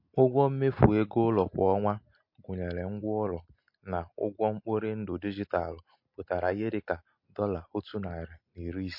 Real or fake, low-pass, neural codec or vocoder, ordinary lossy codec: real; 5.4 kHz; none; MP3, 32 kbps